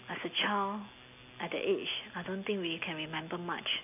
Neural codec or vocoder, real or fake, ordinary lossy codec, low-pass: none; real; none; 3.6 kHz